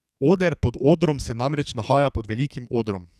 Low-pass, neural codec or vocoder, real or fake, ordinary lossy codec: 14.4 kHz; codec, 44.1 kHz, 2.6 kbps, SNAC; fake; none